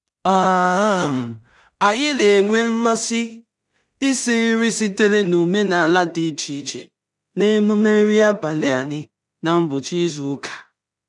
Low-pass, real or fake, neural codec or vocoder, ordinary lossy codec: 10.8 kHz; fake; codec, 16 kHz in and 24 kHz out, 0.4 kbps, LongCat-Audio-Codec, two codebook decoder; none